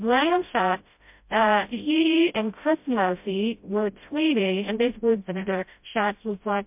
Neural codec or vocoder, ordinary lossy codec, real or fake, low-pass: codec, 16 kHz, 0.5 kbps, FreqCodec, smaller model; MP3, 32 kbps; fake; 3.6 kHz